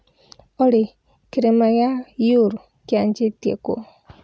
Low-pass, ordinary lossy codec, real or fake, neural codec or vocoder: none; none; real; none